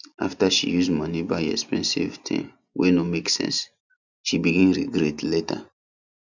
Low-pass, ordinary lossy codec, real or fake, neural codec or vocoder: 7.2 kHz; none; real; none